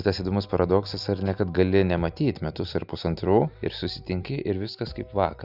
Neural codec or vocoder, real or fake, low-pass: none; real; 5.4 kHz